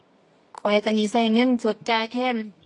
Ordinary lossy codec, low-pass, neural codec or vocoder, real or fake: AAC, 48 kbps; 10.8 kHz; codec, 24 kHz, 0.9 kbps, WavTokenizer, medium music audio release; fake